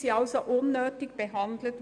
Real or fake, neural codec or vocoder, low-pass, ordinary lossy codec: real; none; 9.9 kHz; none